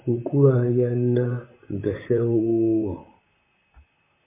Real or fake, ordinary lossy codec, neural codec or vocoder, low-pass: fake; MP3, 24 kbps; vocoder, 44.1 kHz, 128 mel bands, Pupu-Vocoder; 3.6 kHz